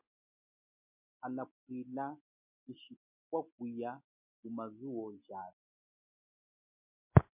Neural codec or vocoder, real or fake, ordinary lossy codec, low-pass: none; real; MP3, 32 kbps; 3.6 kHz